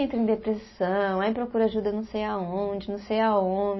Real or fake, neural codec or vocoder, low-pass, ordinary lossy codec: real; none; 7.2 kHz; MP3, 24 kbps